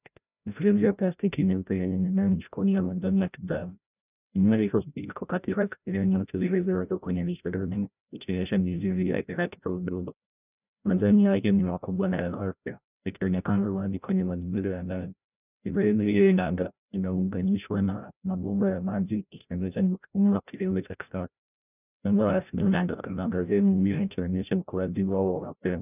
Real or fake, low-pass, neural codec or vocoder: fake; 3.6 kHz; codec, 16 kHz, 0.5 kbps, FreqCodec, larger model